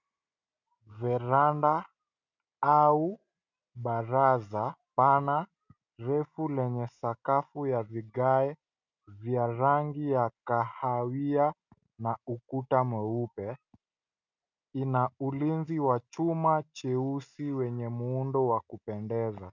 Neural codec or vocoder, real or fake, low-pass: none; real; 7.2 kHz